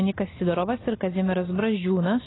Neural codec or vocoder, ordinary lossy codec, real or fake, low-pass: none; AAC, 16 kbps; real; 7.2 kHz